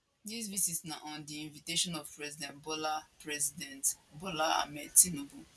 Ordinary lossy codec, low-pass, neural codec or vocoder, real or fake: none; none; none; real